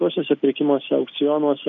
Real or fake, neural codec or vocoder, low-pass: real; none; 7.2 kHz